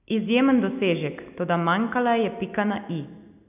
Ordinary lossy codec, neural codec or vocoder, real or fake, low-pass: none; none; real; 3.6 kHz